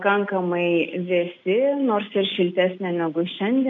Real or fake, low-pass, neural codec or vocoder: real; 7.2 kHz; none